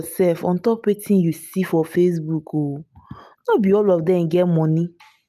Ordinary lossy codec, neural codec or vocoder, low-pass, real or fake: none; none; 14.4 kHz; real